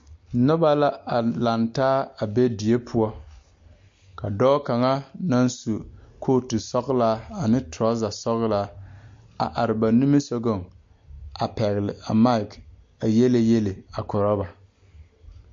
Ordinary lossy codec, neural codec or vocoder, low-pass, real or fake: MP3, 48 kbps; none; 7.2 kHz; real